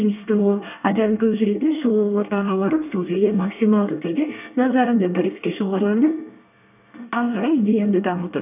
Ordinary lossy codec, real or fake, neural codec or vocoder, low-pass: none; fake; codec, 24 kHz, 1 kbps, SNAC; 3.6 kHz